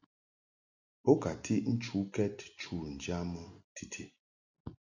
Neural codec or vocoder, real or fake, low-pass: none; real; 7.2 kHz